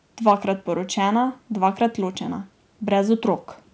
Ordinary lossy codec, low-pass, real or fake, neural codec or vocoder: none; none; real; none